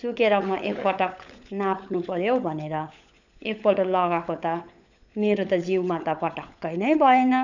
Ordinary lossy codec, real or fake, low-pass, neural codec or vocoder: none; fake; 7.2 kHz; codec, 16 kHz, 16 kbps, FunCodec, trained on LibriTTS, 50 frames a second